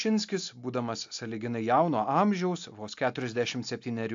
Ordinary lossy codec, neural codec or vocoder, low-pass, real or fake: AAC, 64 kbps; none; 7.2 kHz; real